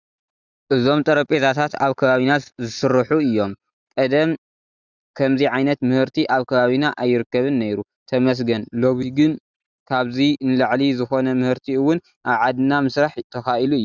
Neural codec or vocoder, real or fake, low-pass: none; real; 7.2 kHz